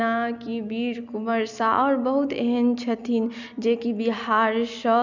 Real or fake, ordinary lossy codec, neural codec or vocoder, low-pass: real; none; none; 7.2 kHz